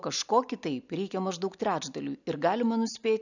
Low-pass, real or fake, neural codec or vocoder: 7.2 kHz; real; none